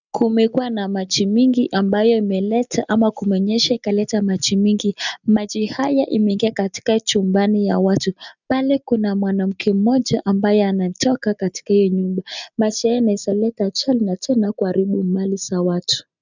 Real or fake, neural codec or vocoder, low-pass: real; none; 7.2 kHz